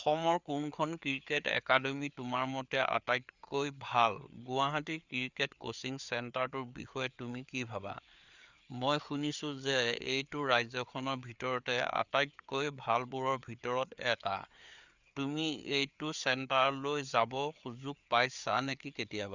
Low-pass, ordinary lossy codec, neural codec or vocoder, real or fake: 7.2 kHz; Opus, 64 kbps; codec, 16 kHz, 4 kbps, FreqCodec, larger model; fake